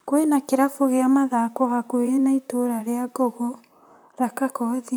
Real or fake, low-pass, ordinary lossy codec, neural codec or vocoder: fake; none; none; vocoder, 44.1 kHz, 128 mel bands, Pupu-Vocoder